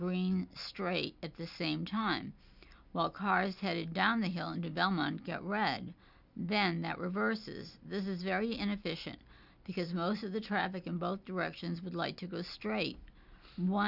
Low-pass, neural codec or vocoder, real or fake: 5.4 kHz; none; real